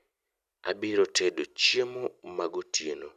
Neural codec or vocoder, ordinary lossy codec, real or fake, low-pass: none; none; real; 14.4 kHz